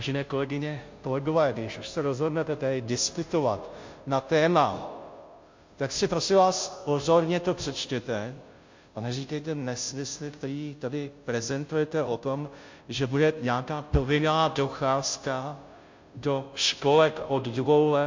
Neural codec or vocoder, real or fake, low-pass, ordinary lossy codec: codec, 16 kHz, 0.5 kbps, FunCodec, trained on Chinese and English, 25 frames a second; fake; 7.2 kHz; MP3, 48 kbps